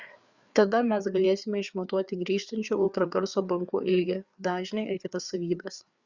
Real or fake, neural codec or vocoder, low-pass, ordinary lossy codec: fake; codec, 16 kHz, 4 kbps, FreqCodec, larger model; 7.2 kHz; Opus, 64 kbps